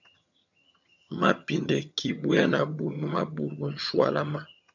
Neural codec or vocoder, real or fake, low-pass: vocoder, 22.05 kHz, 80 mel bands, HiFi-GAN; fake; 7.2 kHz